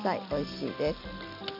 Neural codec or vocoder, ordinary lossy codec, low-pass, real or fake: none; none; 5.4 kHz; real